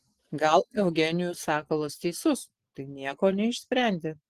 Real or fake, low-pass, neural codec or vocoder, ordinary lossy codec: real; 14.4 kHz; none; Opus, 16 kbps